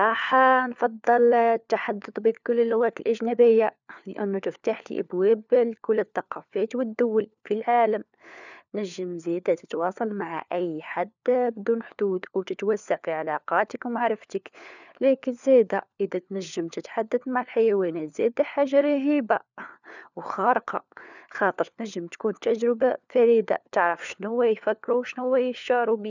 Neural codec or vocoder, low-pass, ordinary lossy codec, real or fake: codec, 16 kHz, 4 kbps, FunCodec, trained on LibriTTS, 50 frames a second; 7.2 kHz; none; fake